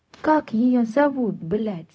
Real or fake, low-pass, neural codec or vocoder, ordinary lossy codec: fake; none; codec, 16 kHz, 0.4 kbps, LongCat-Audio-Codec; none